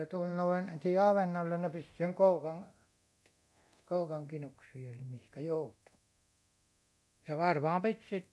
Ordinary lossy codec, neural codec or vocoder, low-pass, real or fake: none; codec, 24 kHz, 0.9 kbps, DualCodec; none; fake